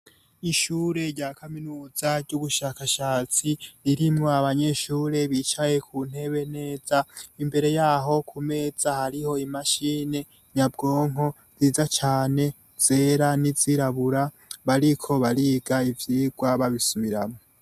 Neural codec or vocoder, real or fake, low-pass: none; real; 14.4 kHz